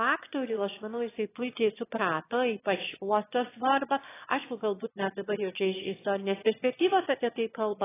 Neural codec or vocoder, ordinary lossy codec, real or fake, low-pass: autoencoder, 22.05 kHz, a latent of 192 numbers a frame, VITS, trained on one speaker; AAC, 16 kbps; fake; 3.6 kHz